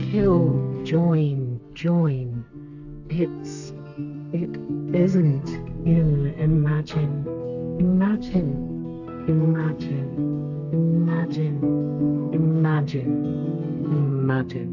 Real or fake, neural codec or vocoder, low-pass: fake; codec, 32 kHz, 1.9 kbps, SNAC; 7.2 kHz